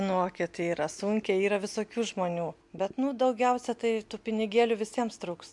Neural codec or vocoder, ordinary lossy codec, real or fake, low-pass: none; MP3, 64 kbps; real; 10.8 kHz